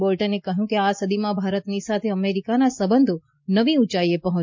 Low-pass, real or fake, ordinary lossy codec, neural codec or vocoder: 7.2 kHz; real; MP3, 48 kbps; none